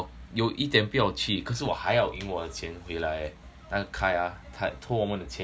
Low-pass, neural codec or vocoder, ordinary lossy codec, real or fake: none; none; none; real